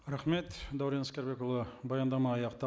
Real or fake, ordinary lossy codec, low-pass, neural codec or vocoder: real; none; none; none